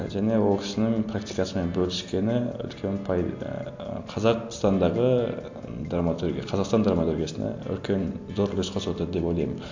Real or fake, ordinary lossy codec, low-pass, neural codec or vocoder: real; AAC, 48 kbps; 7.2 kHz; none